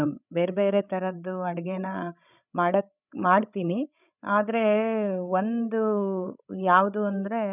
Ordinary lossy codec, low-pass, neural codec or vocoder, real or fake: none; 3.6 kHz; codec, 16 kHz, 16 kbps, FreqCodec, larger model; fake